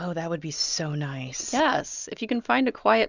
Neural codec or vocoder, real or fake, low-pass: none; real; 7.2 kHz